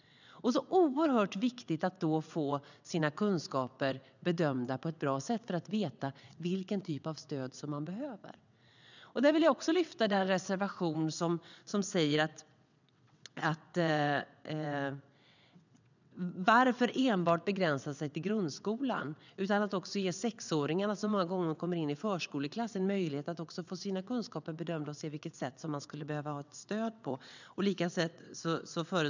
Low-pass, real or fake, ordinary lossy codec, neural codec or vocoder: 7.2 kHz; fake; none; vocoder, 22.05 kHz, 80 mel bands, WaveNeXt